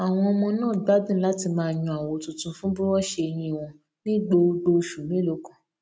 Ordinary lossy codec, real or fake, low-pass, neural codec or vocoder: none; real; none; none